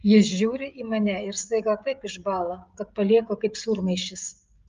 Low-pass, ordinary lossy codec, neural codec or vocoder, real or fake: 7.2 kHz; Opus, 32 kbps; codec, 16 kHz, 16 kbps, FreqCodec, smaller model; fake